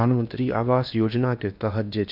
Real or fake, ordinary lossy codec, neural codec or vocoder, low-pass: fake; none; codec, 16 kHz in and 24 kHz out, 0.8 kbps, FocalCodec, streaming, 65536 codes; 5.4 kHz